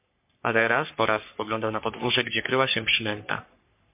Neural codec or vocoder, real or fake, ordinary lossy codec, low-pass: codec, 44.1 kHz, 3.4 kbps, Pupu-Codec; fake; MP3, 32 kbps; 3.6 kHz